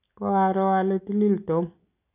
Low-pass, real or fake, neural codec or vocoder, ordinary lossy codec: 3.6 kHz; real; none; none